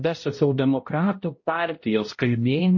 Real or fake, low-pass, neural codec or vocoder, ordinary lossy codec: fake; 7.2 kHz; codec, 16 kHz, 0.5 kbps, X-Codec, HuBERT features, trained on balanced general audio; MP3, 32 kbps